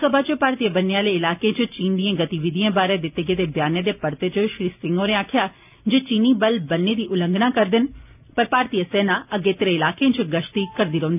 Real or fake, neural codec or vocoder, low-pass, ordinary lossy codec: real; none; 3.6 kHz; none